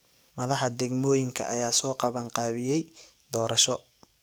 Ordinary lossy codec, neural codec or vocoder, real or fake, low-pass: none; codec, 44.1 kHz, 7.8 kbps, DAC; fake; none